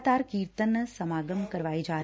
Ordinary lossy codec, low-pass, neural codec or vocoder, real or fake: none; none; none; real